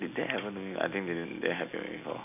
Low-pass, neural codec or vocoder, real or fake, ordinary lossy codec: 3.6 kHz; none; real; none